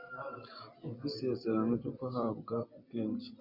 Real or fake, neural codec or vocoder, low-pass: real; none; 5.4 kHz